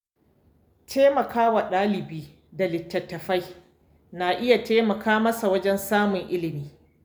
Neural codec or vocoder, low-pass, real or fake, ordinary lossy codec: none; none; real; none